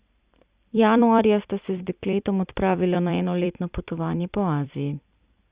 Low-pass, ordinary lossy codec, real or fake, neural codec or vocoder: 3.6 kHz; Opus, 64 kbps; fake; vocoder, 44.1 kHz, 128 mel bands every 256 samples, BigVGAN v2